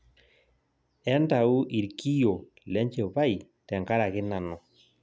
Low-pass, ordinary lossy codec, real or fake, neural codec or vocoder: none; none; real; none